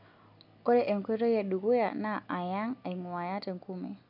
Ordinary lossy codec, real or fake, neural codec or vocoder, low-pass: none; real; none; 5.4 kHz